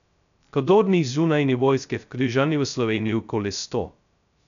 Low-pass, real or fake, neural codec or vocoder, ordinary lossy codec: 7.2 kHz; fake; codec, 16 kHz, 0.2 kbps, FocalCodec; none